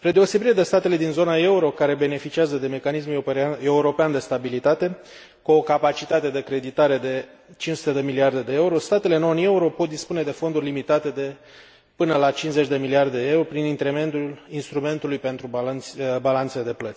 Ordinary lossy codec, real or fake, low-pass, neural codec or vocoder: none; real; none; none